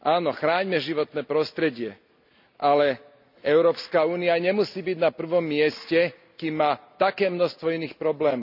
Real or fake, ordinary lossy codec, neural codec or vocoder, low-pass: real; none; none; 5.4 kHz